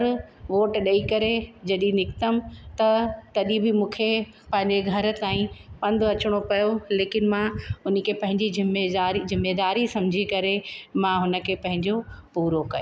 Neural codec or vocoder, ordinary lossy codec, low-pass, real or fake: none; none; none; real